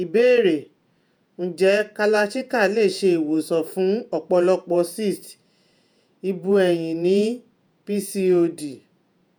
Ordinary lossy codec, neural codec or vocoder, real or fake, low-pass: none; vocoder, 48 kHz, 128 mel bands, Vocos; fake; none